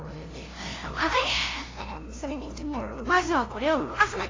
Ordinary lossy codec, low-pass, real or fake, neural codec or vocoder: AAC, 32 kbps; 7.2 kHz; fake; codec, 16 kHz, 0.5 kbps, FunCodec, trained on LibriTTS, 25 frames a second